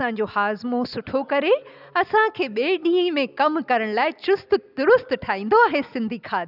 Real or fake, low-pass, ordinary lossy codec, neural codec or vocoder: real; 5.4 kHz; none; none